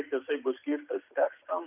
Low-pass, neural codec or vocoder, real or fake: 3.6 kHz; none; real